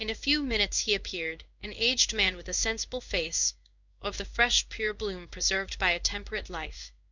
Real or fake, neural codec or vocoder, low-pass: fake; codec, 16 kHz in and 24 kHz out, 1 kbps, XY-Tokenizer; 7.2 kHz